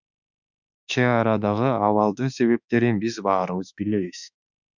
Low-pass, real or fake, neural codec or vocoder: 7.2 kHz; fake; autoencoder, 48 kHz, 32 numbers a frame, DAC-VAE, trained on Japanese speech